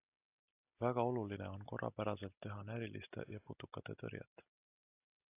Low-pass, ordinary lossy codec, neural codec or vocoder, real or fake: 3.6 kHz; AAC, 24 kbps; none; real